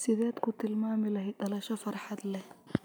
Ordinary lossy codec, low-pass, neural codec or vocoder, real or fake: none; none; none; real